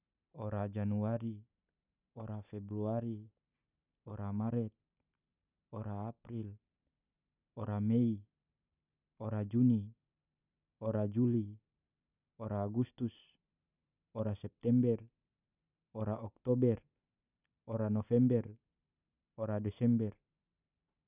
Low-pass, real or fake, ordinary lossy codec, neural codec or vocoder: 3.6 kHz; real; none; none